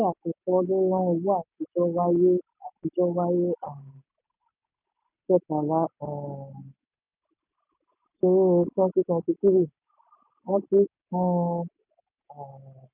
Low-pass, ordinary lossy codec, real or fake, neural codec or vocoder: 3.6 kHz; none; real; none